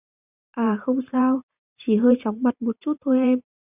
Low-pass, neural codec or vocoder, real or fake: 3.6 kHz; vocoder, 44.1 kHz, 128 mel bands every 512 samples, BigVGAN v2; fake